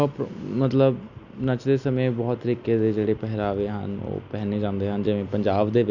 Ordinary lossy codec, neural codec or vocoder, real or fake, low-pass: none; none; real; 7.2 kHz